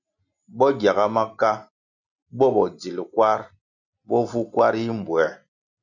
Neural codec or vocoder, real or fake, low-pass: none; real; 7.2 kHz